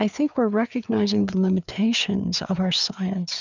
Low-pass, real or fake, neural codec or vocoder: 7.2 kHz; fake; codec, 16 kHz, 4 kbps, FreqCodec, larger model